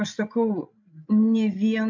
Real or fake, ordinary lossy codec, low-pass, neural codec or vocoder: fake; MP3, 64 kbps; 7.2 kHz; codec, 16 kHz, 16 kbps, FreqCodec, larger model